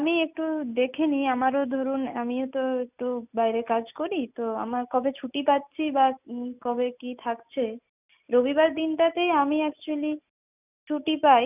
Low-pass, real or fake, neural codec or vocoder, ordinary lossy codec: 3.6 kHz; real; none; none